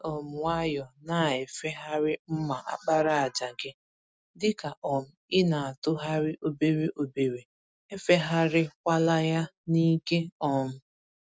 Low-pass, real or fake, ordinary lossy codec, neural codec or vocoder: none; real; none; none